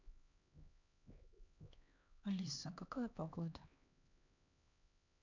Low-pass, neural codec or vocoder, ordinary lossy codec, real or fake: 7.2 kHz; codec, 16 kHz, 2 kbps, X-Codec, HuBERT features, trained on LibriSpeech; none; fake